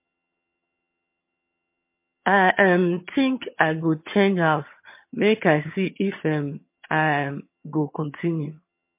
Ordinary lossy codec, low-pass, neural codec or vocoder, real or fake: MP3, 24 kbps; 3.6 kHz; vocoder, 22.05 kHz, 80 mel bands, HiFi-GAN; fake